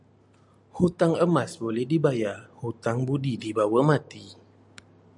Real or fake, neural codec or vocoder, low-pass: real; none; 10.8 kHz